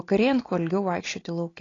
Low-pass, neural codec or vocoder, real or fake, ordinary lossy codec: 7.2 kHz; none; real; AAC, 32 kbps